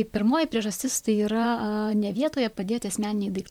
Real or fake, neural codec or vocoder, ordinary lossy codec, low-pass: fake; vocoder, 44.1 kHz, 128 mel bands, Pupu-Vocoder; MP3, 96 kbps; 19.8 kHz